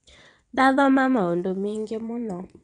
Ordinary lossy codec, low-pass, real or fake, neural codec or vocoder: none; 9.9 kHz; fake; vocoder, 22.05 kHz, 80 mel bands, WaveNeXt